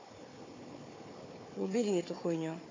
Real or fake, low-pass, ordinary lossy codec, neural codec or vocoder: fake; 7.2 kHz; AAC, 32 kbps; codec, 16 kHz, 4 kbps, FunCodec, trained on Chinese and English, 50 frames a second